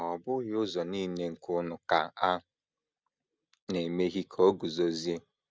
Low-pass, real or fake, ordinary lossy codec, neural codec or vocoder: none; real; none; none